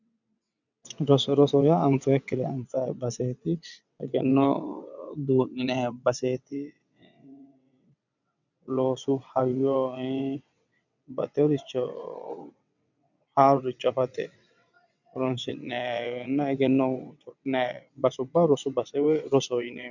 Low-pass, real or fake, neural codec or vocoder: 7.2 kHz; fake; vocoder, 22.05 kHz, 80 mel bands, WaveNeXt